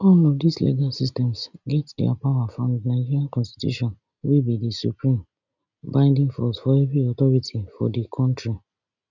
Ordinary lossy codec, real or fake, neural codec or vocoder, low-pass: none; real; none; 7.2 kHz